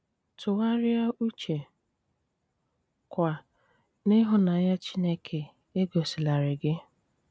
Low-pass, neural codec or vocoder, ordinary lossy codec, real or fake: none; none; none; real